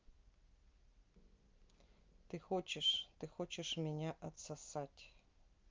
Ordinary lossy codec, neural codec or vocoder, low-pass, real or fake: Opus, 24 kbps; none; 7.2 kHz; real